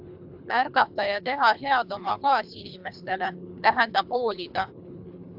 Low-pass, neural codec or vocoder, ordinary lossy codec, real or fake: 5.4 kHz; codec, 24 kHz, 3 kbps, HILCodec; AAC, 48 kbps; fake